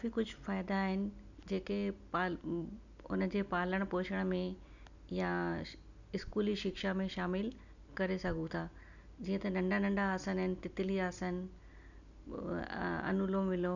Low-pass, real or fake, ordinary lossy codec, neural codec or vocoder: 7.2 kHz; real; none; none